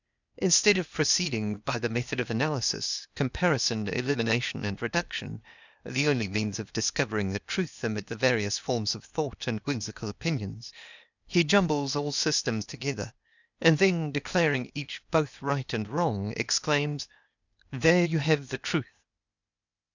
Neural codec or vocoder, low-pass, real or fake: codec, 16 kHz, 0.8 kbps, ZipCodec; 7.2 kHz; fake